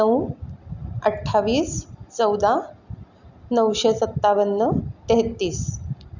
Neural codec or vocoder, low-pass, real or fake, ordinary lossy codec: none; 7.2 kHz; real; none